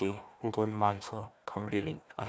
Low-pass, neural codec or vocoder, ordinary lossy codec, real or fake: none; codec, 16 kHz, 1 kbps, FunCodec, trained on Chinese and English, 50 frames a second; none; fake